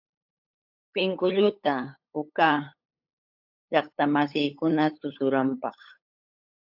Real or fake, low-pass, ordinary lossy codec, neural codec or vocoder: fake; 5.4 kHz; AAC, 48 kbps; codec, 16 kHz, 8 kbps, FunCodec, trained on LibriTTS, 25 frames a second